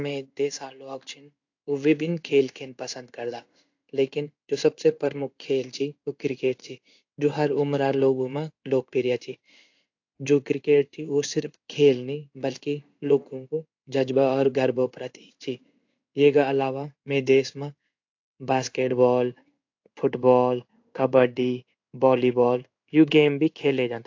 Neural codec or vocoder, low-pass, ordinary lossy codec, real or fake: codec, 16 kHz in and 24 kHz out, 1 kbps, XY-Tokenizer; 7.2 kHz; AAC, 48 kbps; fake